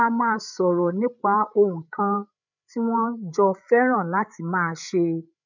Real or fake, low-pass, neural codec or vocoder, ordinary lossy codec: fake; 7.2 kHz; codec, 16 kHz, 8 kbps, FreqCodec, larger model; none